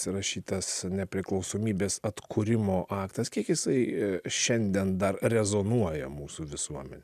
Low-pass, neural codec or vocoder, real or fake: 14.4 kHz; none; real